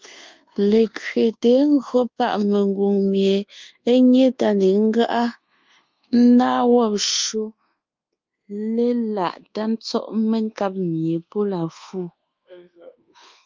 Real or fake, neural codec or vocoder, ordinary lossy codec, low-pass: fake; codec, 24 kHz, 1.2 kbps, DualCodec; Opus, 32 kbps; 7.2 kHz